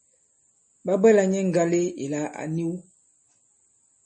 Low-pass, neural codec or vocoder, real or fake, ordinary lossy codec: 9.9 kHz; none; real; MP3, 32 kbps